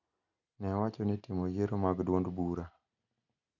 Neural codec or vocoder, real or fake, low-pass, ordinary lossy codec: none; real; 7.2 kHz; none